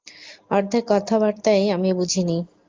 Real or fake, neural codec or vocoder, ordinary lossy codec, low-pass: real; none; Opus, 24 kbps; 7.2 kHz